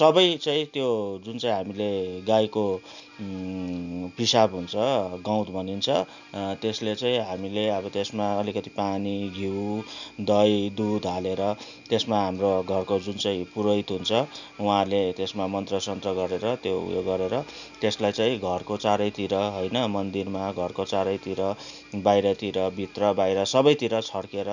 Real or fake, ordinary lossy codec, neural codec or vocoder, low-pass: real; none; none; 7.2 kHz